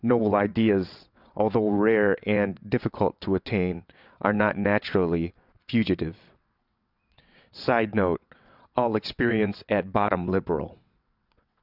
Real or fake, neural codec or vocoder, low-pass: fake; vocoder, 22.05 kHz, 80 mel bands, WaveNeXt; 5.4 kHz